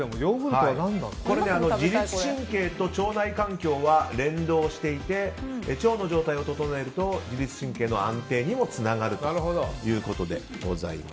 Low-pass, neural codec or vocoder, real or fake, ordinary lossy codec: none; none; real; none